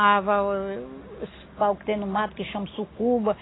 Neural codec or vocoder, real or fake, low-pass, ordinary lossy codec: none; real; 7.2 kHz; AAC, 16 kbps